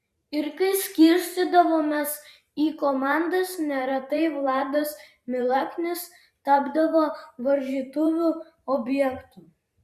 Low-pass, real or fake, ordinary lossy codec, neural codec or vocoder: 14.4 kHz; fake; Opus, 64 kbps; vocoder, 44.1 kHz, 128 mel bands every 512 samples, BigVGAN v2